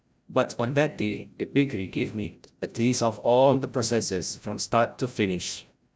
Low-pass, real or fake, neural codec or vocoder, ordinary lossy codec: none; fake; codec, 16 kHz, 0.5 kbps, FreqCodec, larger model; none